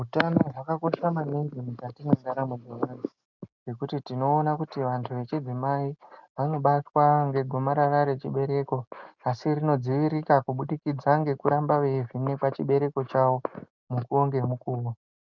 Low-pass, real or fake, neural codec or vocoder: 7.2 kHz; real; none